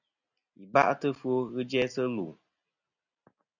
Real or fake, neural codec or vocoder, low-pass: real; none; 7.2 kHz